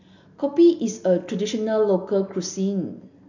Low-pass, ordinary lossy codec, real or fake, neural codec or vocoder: 7.2 kHz; none; real; none